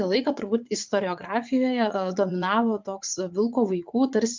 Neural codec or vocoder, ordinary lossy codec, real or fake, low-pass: vocoder, 44.1 kHz, 80 mel bands, Vocos; MP3, 64 kbps; fake; 7.2 kHz